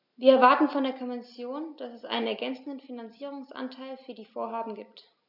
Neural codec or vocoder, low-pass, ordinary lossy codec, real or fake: none; 5.4 kHz; none; real